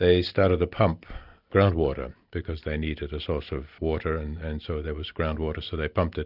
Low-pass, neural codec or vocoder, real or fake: 5.4 kHz; none; real